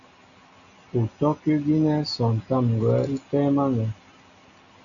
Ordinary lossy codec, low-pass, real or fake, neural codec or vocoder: MP3, 96 kbps; 7.2 kHz; real; none